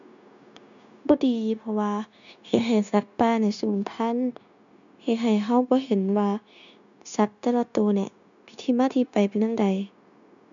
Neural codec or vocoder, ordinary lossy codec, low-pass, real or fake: codec, 16 kHz, 0.9 kbps, LongCat-Audio-Codec; none; 7.2 kHz; fake